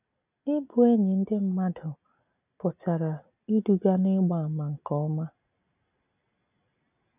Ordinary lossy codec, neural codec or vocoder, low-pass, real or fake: none; none; 3.6 kHz; real